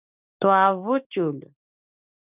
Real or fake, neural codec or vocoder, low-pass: real; none; 3.6 kHz